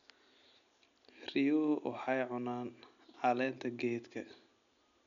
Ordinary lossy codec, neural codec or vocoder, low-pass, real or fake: none; none; 7.2 kHz; real